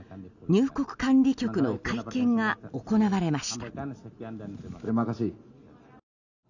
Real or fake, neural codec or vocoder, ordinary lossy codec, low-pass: real; none; none; 7.2 kHz